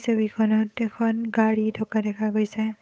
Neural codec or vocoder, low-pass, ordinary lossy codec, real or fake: codec, 16 kHz, 8 kbps, FunCodec, trained on Chinese and English, 25 frames a second; none; none; fake